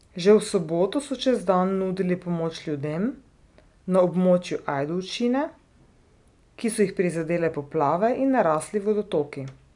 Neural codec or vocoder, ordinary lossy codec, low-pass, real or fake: none; none; 10.8 kHz; real